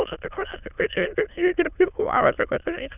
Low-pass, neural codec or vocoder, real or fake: 3.6 kHz; autoencoder, 22.05 kHz, a latent of 192 numbers a frame, VITS, trained on many speakers; fake